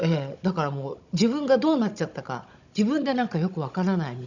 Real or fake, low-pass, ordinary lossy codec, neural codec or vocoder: fake; 7.2 kHz; none; codec, 16 kHz, 16 kbps, FunCodec, trained on Chinese and English, 50 frames a second